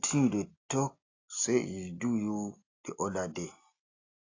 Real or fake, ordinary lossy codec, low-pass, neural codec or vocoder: real; AAC, 32 kbps; 7.2 kHz; none